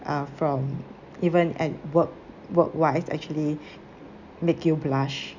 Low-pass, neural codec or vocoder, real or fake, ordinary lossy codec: 7.2 kHz; none; real; none